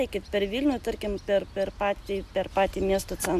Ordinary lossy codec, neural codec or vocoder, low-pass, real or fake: AAC, 96 kbps; none; 14.4 kHz; real